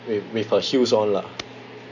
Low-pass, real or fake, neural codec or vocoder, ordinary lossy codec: 7.2 kHz; real; none; none